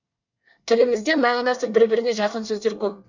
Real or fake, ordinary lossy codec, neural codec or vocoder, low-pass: fake; none; codec, 24 kHz, 1 kbps, SNAC; 7.2 kHz